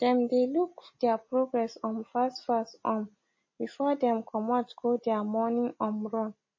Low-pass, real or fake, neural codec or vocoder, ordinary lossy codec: 7.2 kHz; real; none; MP3, 32 kbps